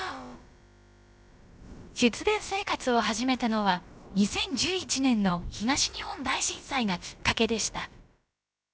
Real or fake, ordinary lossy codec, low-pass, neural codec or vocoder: fake; none; none; codec, 16 kHz, about 1 kbps, DyCAST, with the encoder's durations